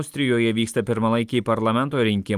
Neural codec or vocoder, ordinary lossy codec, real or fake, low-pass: vocoder, 44.1 kHz, 128 mel bands every 256 samples, BigVGAN v2; Opus, 32 kbps; fake; 14.4 kHz